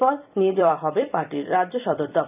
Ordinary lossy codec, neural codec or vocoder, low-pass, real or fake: none; vocoder, 44.1 kHz, 128 mel bands every 256 samples, BigVGAN v2; 3.6 kHz; fake